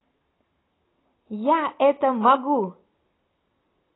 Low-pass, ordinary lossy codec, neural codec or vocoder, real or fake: 7.2 kHz; AAC, 16 kbps; none; real